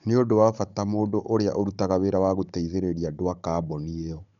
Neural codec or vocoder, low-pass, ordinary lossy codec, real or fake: codec, 16 kHz, 16 kbps, FunCodec, trained on Chinese and English, 50 frames a second; 7.2 kHz; none; fake